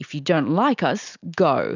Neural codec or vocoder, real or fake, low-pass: none; real; 7.2 kHz